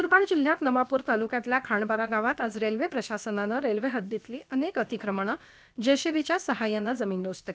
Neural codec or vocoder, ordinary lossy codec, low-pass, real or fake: codec, 16 kHz, about 1 kbps, DyCAST, with the encoder's durations; none; none; fake